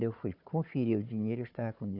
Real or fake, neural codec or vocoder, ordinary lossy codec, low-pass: fake; codec, 16 kHz, 4 kbps, FunCodec, trained on Chinese and English, 50 frames a second; none; 5.4 kHz